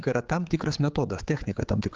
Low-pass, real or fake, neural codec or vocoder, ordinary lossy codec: 7.2 kHz; fake; codec, 16 kHz, 4 kbps, X-Codec, HuBERT features, trained on general audio; Opus, 32 kbps